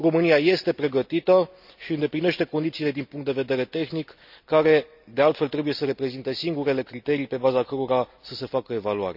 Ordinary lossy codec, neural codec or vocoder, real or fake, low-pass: none; none; real; 5.4 kHz